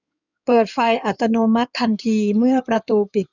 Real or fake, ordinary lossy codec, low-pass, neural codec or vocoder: fake; none; 7.2 kHz; codec, 16 kHz in and 24 kHz out, 2.2 kbps, FireRedTTS-2 codec